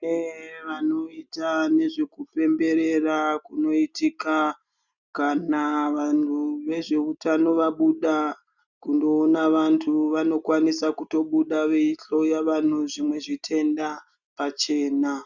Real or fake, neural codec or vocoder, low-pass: real; none; 7.2 kHz